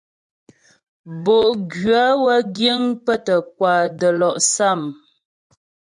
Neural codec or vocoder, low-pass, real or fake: vocoder, 24 kHz, 100 mel bands, Vocos; 10.8 kHz; fake